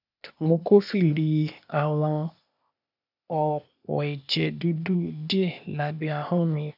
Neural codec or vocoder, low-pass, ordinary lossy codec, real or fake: codec, 16 kHz, 0.8 kbps, ZipCodec; 5.4 kHz; none; fake